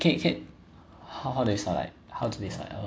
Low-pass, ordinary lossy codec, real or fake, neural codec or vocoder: none; none; real; none